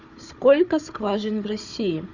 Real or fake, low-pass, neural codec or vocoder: fake; 7.2 kHz; codec, 16 kHz, 4 kbps, FunCodec, trained on Chinese and English, 50 frames a second